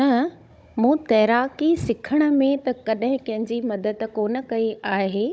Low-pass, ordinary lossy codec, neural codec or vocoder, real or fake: none; none; codec, 16 kHz, 16 kbps, FunCodec, trained on Chinese and English, 50 frames a second; fake